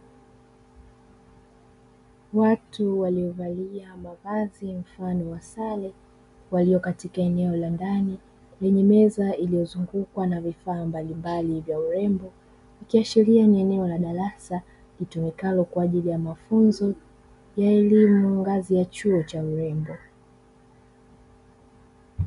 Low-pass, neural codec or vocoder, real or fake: 10.8 kHz; none; real